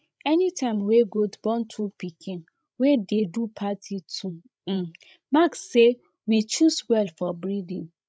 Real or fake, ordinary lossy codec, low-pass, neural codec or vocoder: fake; none; none; codec, 16 kHz, 16 kbps, FreqCodec, larger model